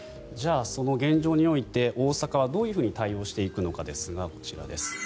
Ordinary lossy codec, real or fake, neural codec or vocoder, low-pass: none; real; none; none